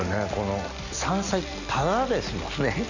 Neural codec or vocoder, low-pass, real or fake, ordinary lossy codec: none; 7.2 kHz; real; Opus, 64 kbps